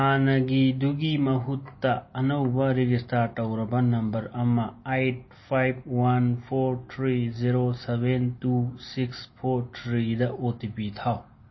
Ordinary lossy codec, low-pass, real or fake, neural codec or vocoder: MP3, 24 kbps; 7.2 kHz; real; none